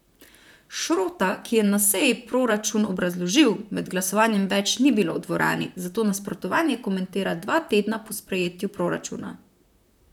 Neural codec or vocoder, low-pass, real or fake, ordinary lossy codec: vocoder, 44.1 kHz, 128 mel bands, Pupu-Vocoder; 19.8 kHz; fake; none